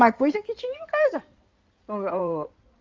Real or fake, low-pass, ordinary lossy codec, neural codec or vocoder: fake; 7.2 kHz; Opus, 32 kbps; codec, 16 kHz in and 24 kHz out, 2.2 kbps, FireRedTTS-2 codec